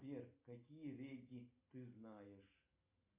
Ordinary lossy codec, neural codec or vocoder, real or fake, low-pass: MP3, 24 kbps; none; real; 3.6 kHz